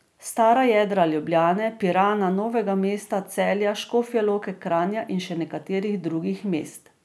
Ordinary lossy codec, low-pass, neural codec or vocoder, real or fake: none; none; none; real